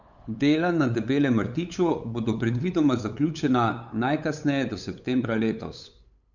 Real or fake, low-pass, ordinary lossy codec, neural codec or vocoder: fake; 7.2 kHz; none; codec, 16 kHz, 8 kbps, FunCodec, trained on LibriTTS, 25 frames a second